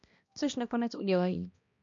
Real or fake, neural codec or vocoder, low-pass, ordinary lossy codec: fake; codec, 16 kHz, 1 kbps, X-Codec, HuBERT features, trained on balanced general audio; 7.2 kHz; MP3, 64 kbps